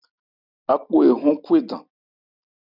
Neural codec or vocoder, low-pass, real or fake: none; 5.4 kHz; real